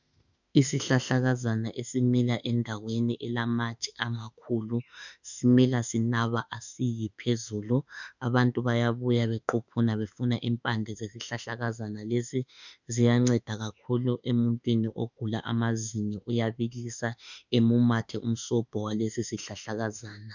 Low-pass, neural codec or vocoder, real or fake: 7.2 kHz; autoencoder, 48 kHz, 32 numbers a frame, DAC-VAE, trained on Japanese speech; fake